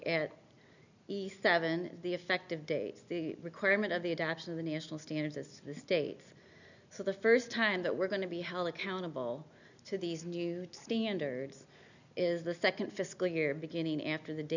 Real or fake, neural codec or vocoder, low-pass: real; none; 7.2 kHz